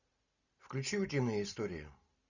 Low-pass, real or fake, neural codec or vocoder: 7.2 kHz; real; none